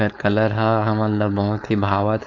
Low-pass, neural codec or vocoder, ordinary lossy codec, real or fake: 7.2 kHz; codec, 16 kHz, 4.8 kbps, FACodec; none; fake